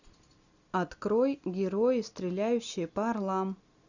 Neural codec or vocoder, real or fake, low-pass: none; real; 7.2 kHz